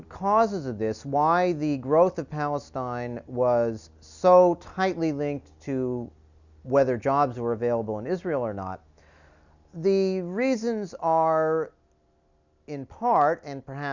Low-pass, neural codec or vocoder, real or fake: 7.2 kHz; none; real